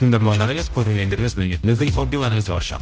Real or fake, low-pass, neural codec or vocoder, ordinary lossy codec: fake; none; codec, 16 kHz, 0.5 kbps, X-Codec, HuBERT features, trained on general audio; none